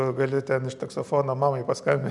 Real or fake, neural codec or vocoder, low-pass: real; none; 10.8 kHz